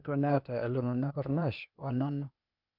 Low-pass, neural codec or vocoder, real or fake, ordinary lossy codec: 5.4 kHz; codec, 16 kHz, 0.8 kbps, ZipCodec; fake; none